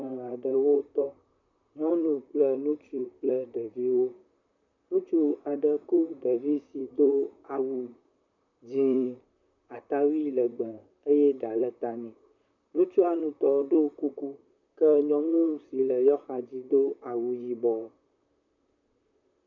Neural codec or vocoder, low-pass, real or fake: vocoder, 44.1 kHz, 128 mel bands, Pupu-Vocoder; 7.2 kHz; fake